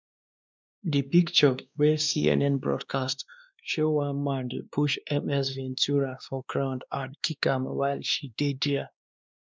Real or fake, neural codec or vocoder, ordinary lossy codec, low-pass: fake; codec, 16 kHz, 2 kbps, X-Codec, WavLM features, trained on Multilingual LibriSpeech; none; none